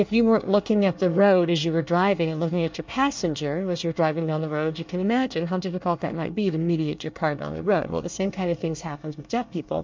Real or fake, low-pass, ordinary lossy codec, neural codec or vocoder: fake; 7.2 kHz; MP3, 64 kbps; codec, 24 kHz, 1 kbps, SNAC